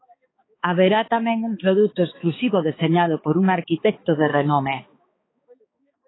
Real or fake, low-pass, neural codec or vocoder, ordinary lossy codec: fake; 7.2 kHz; codec, 16 kHz, 4 kbps, X-Codec, HuBERT features, trained on balanced general audio; AAC, 16 kbps